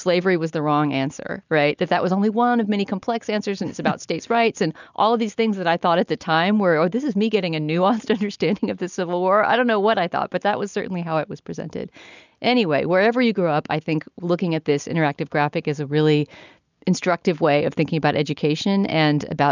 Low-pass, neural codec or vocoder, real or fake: 7.2 kHz; none; real